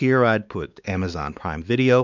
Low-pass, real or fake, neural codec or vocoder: 7.2 kHz; fake; codec, 16 kHz, 4 kbps, X-Codec, WavLM features, trained on Multilingual LibriSpeech